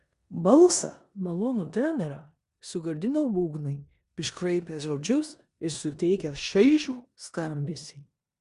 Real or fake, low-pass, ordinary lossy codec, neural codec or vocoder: fake; 10.8 kHz; Opus, 64 kbps; codec, 16 kHz in and 24 kHz out, 0.9 kbps, LongCat-Audio-Codec, four codebook decoder